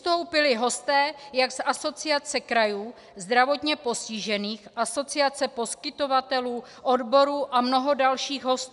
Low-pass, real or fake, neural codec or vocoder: 10.8 kHz; real; none